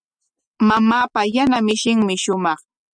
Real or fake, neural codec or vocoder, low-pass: real; none; 9.9 kHz